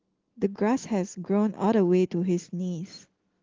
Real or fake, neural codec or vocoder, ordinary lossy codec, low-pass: real; none; Opus, 16 kbps; 7.2 kHz